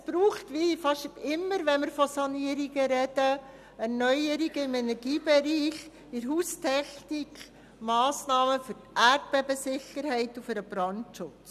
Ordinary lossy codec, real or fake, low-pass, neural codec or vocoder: none; real; 14.4 kHz; none